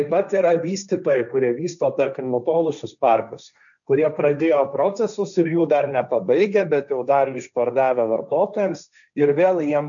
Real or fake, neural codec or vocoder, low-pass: fake; codec, 16 kHz, 1.1 kbps, Voila-Tokenizer; 7.2 kHz